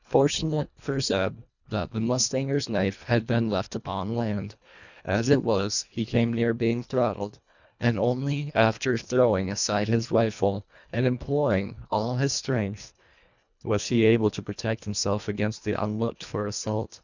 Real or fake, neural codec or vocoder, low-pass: fake; codec, 24 kHz, 1.5 kbps, HILCodec; 7.2 kHz